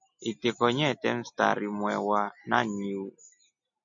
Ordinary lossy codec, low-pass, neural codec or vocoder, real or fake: MP3, 48 kbps; 7.2 kHz; none; real